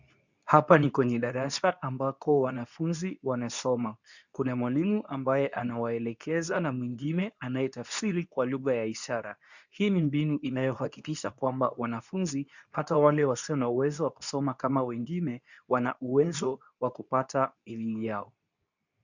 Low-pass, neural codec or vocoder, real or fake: 7.2 kHz; codec, 24 kHz, 0.9 kbps, WavTokenizer, medium speech release version 2; fake